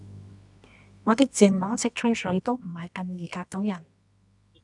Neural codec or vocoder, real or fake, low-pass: codec, 24 kHz, 0.9 kbps, WavTokenizer, medium music audio release; fake; 10.8 kHz